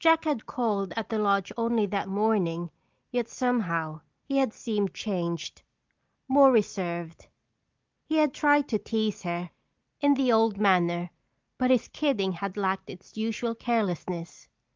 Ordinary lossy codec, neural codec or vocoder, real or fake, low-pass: Opus, 24 kbps; none; real; 7.2 kHz